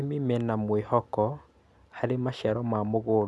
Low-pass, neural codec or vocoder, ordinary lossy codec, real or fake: none; none; none; real